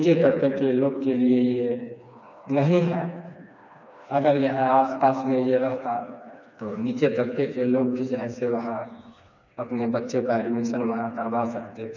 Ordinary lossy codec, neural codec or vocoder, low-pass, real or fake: none; codec, 16 kHz, 2 kbps, FreqCodec, smaller model; 7.2 kHz; fake